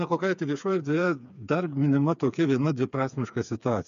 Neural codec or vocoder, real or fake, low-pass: codec, 16 kHz, 4 kbps, FreqCodec, smaller model; fake; 7.2 kHz